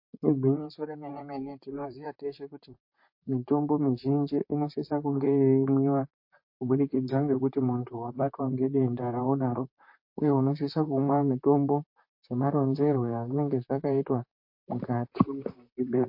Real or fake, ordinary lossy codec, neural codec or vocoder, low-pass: fake; MP3, 32 kbps; vocoder, 44.1 kHz, 128 mel bands, Pupu-Vocoder; 5.4 kHz